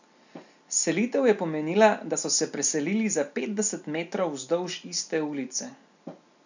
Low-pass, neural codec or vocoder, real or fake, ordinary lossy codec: 7.2 kHz; none; real; none